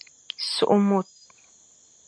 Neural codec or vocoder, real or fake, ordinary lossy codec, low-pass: none; real; MP3, 64 kbps; 9.9 kHz